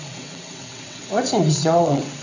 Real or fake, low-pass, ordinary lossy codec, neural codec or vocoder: fake; 7.2 kHz; none; vocoder, 22.05 kHz, 80 mel bands, WaveNeXt